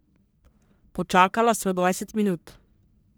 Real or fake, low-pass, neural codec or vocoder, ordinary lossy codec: fake; none; codec, 44.1 kHz, 1.7 kbps, Pupu-Codec; none